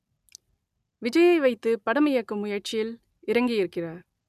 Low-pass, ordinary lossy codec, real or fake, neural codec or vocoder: 14.4 kHz; none; real; none